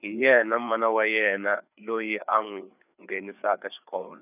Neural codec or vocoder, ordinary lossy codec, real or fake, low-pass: codec, 16 kHz, 4 kbps, FreqCodec, larger model; none; fake; 3.6 kHz